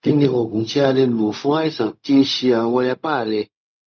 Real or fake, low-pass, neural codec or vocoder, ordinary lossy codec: fake; 7.2 kHz; codec, 16 kHz, 0.4 kbps, LongCat-Audio-Codec; none